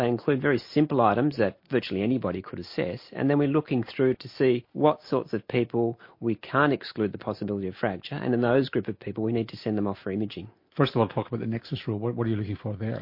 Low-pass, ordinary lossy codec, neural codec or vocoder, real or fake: 5.4 kHz; MP3, 32 kbps; none; real